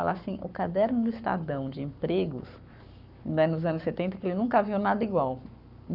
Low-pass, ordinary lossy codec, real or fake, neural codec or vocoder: 5.4 kHz; none; fake; codec, 44.1 kHz, 7.8 kbps, Pupu-Codec